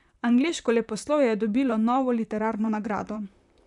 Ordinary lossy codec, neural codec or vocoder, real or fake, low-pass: none; vocoder, 44.1 kHz, 128 mel bands, Pupu-Vocoder; fake; 10.8 kHz